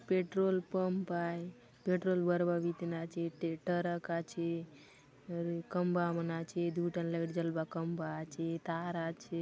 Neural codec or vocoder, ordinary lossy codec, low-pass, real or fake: none; none; none; real